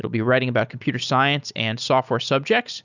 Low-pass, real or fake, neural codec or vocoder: 7.2 kHz; real; none